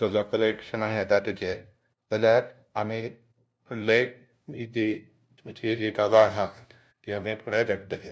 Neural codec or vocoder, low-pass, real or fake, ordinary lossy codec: codec, 16 kHz, 0.5 kbps, FunCodec, trained on LibriTTS, 25 frames a second; none; fake; none